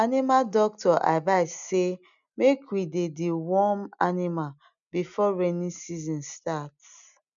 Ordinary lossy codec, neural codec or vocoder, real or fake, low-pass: none; none; real; 7.2 kHz